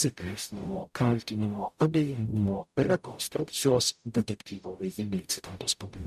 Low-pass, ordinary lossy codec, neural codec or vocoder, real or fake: 14.4 kHz; MP3, 96 kbps; codec, 44.1 kHz, 0.9 kbps, DAC; fake